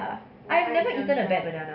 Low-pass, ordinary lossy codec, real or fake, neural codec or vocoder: 5.4 kHz; none; real; none